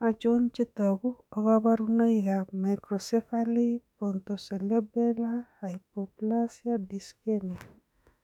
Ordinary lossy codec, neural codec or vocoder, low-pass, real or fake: none; autoencoder, 48 kHz, 32 numbers a frame, DAC-VAE, trained on Japanese speech; 19.8 kHz; fake